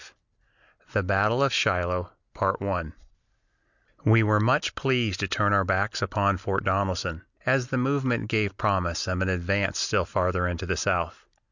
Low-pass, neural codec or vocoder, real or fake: 7.2 kHz; none; real